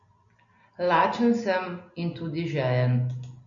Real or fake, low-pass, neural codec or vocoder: real; 7.2 kHz; none